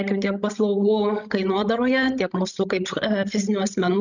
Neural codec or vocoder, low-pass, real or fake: codec, 16 kHz, 16 kbps, FreqCodec, larger model; 7.2 kHz; fake